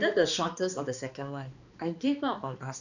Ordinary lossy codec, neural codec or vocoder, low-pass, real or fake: none; codec, 16 kHz, 2 kbps, X-Codec, HuBERT features, trained on balanced general audio; 7.2 kHz; fake